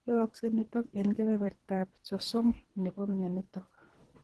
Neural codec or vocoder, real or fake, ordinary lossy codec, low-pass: codec, 24 kHz, 3 kbps, HILCodec; fake; Opus, 16 kbps; 10.8 kHz